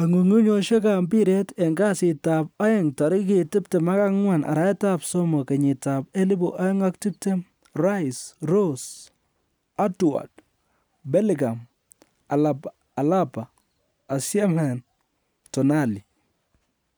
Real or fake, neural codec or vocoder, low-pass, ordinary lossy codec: real; none; none; none